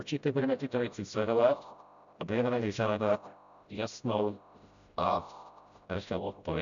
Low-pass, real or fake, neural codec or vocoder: 7.2 kHz; fake; codec, 16 kHz, 0.5 kbps, FreqCodec, smaller model